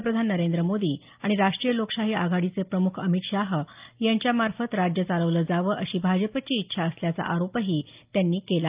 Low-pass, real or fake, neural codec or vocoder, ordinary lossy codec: 3.6 kHz; real; none; Opus, 24 kbps